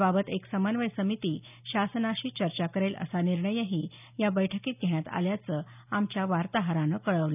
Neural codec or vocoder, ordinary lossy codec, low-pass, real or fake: none; none; 3.6 kHz; real